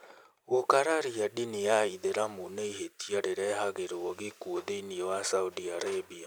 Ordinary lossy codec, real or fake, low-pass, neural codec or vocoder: none; real; none; none